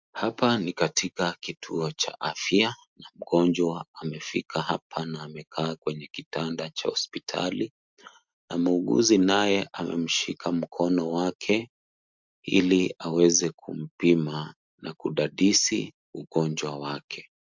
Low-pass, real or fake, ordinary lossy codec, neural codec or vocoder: 7.2 kHz; real; MP3, 64 kbps; none